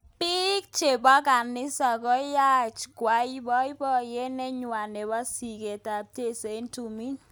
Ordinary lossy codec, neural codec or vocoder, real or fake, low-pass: none; none; real; none